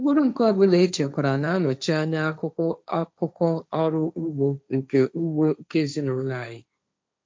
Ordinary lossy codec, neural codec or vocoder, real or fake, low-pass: none; codec, 16 kHz, 1.1 kbps, Voila-Tokenizer; fake; none